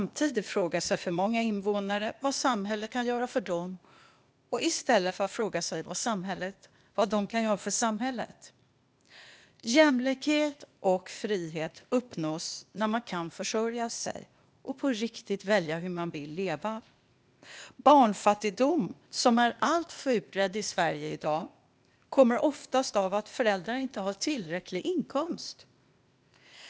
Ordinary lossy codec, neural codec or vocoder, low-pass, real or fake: none; codec, 16 kHz, 0.8 kbps, ZipCodec; none; fake